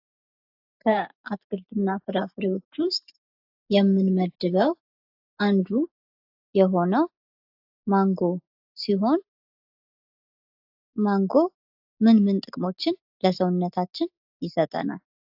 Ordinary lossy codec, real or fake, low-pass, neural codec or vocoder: AAC, 48 kbps; real; 5.4 kHz; none